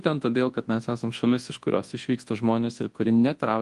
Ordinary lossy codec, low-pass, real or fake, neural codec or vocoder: Opus, 24 kbps; 10.8 kHz; fake; codec, 24 kHz, 0.9 kbps, WavTokenizer, large speech release